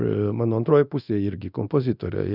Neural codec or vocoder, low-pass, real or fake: codec, 24 kHz, 0.9 kbps, DualCodec; 5.4 kHz; fake